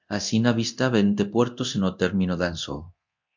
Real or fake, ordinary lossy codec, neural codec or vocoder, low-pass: fake; MP3, 64 kbps; codec, 24 kHz, 0.9 kbps, DualCodec; 7.2 kHz